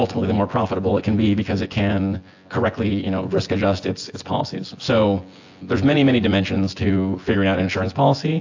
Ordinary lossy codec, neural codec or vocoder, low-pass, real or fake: AAC, 48 kbps; vocoder, 24 kHz, 100 mel bands, Vocos; 7.2 kHz; fake